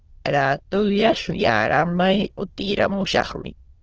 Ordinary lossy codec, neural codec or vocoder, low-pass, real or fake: Opus, 16 kbps; autoencoder, 22.05 kHz, a latent of 192 numbers a frame, VITS, trained on many speakers; 7.2 kHz; fake